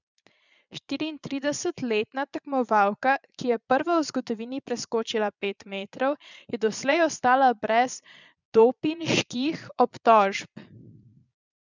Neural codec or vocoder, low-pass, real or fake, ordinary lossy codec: vocoder, 22.05 kHz, 80 mel bands, Vocos; 7.2 kHz; fake; none